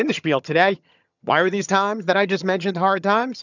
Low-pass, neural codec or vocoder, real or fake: 7.2 kHz; vocoder, 22.05 kHz, 80 mel bands, HiFi-GAN; fake